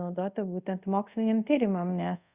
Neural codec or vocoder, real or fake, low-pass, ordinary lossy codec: codec, 24 kHz, 0.5 kbps, DualCodec; fake; 3.6 kHz; Opus, 64 kbps